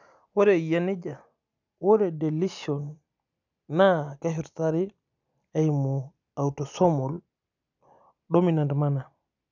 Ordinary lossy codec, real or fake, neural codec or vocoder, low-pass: none; real; none; 7.2 kHz